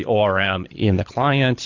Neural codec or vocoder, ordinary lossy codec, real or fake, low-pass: codec, 24 kHz, 6 kbps, HILCodec; AAC, 48 kbps; fake; 7.2 kHz